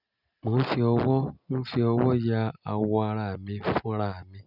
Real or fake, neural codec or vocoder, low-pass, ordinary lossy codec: real; none; 5.4 kHz; none